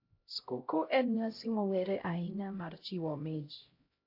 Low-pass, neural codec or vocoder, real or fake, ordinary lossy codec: 5.4 kHz; codec, 16 kHz, 0.5 kbps, X-Codec, HuBERT features, trained on LibriSpeech; fake; AAC, 32 kbps